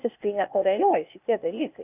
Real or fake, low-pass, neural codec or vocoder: fake; 3.6 kHz; codec, 16 kHz, 0.8 kbps, ZipCodec